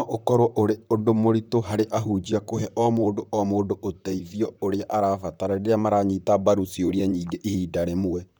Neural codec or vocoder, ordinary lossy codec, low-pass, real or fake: vocoder, 44.1 kHz, 128 mel bands, Pupu-Vocoder; none; none; fake